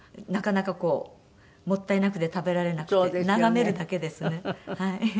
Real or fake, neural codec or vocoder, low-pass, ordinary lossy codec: real; none; none; none